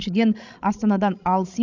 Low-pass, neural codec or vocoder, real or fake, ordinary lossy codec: 7.2 kHz; codec, 16 kHz, 16 kbps, FreqCodec, larger model; fake; none